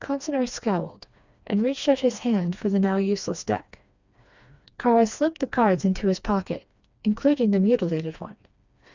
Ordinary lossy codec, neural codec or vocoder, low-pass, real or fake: Opus, 64 kbps; codec, 16 kHz, 2 kbps, FreqCodec, smaller model; 7.2 kHz; fake